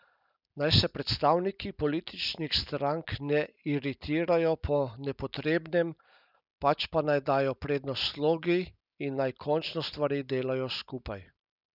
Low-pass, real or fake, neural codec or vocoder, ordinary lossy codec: 5.4 kHz; real; none; none